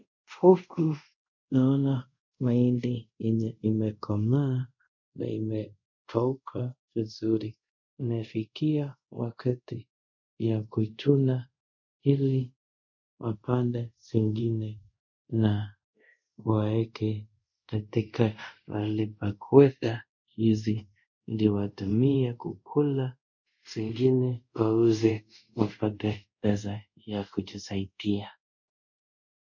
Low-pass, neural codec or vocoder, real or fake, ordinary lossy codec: 7.2 kHz; codec, 24 kHz, 0.5 kbps, DualCodec; fake; MP3, 48 kbps